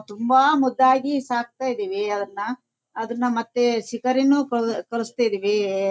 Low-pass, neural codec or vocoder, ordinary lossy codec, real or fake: none; none; none; real